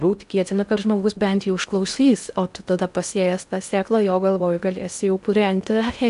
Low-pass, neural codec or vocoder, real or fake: 10.8 kHz; codec, 16 kHz in and 24 kHz out, 0.6 kbps, FocalCodec, streaming, 4096 codes; fake